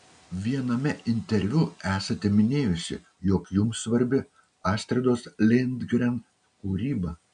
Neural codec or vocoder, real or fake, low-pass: none; real; 9.9 kHz